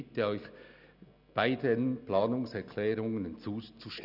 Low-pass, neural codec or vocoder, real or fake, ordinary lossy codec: 5.4 kHz; none; real; none